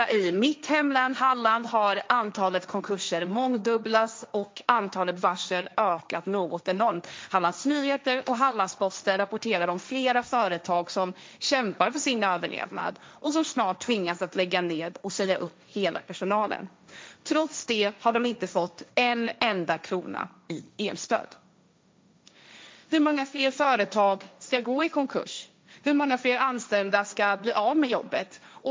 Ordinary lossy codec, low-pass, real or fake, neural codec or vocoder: none; none; fake; codec, 16 kHz, 1.1 kbps, Voila-Tokenizer